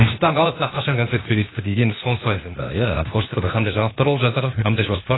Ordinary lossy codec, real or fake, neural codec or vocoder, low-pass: AAC, 16 kbps; fake; codec, 16 kHz, 0.8 kbps, ZipCodec; 7.2 kHz